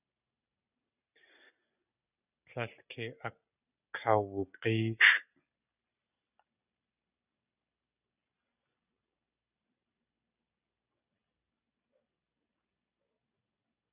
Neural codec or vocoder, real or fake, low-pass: none; real; 3.6 kHz